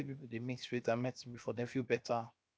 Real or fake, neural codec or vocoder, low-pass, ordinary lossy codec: fake; codec, 16 kHz, 0.7 kbps, FocalCodec; none; none